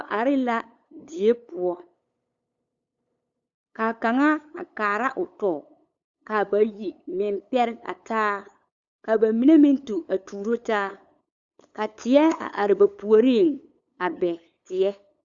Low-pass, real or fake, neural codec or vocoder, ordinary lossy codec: 7.2 kHz; fake; codec, 16 kHz, 8 kbps, FunCodec, trained on LibriTTS, 25 frames a second; Opus, 64 kbps